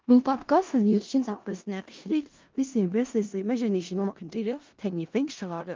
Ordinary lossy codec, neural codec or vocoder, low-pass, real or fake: Opus, 32 kbps; codec, 16 kHz in and 24 kHz out, 0.4 kbps, LongCat-Audio-Codec, four codebook decoder; 7.2 kHz; fake